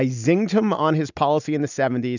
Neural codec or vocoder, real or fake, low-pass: none; real; 7.2 kHz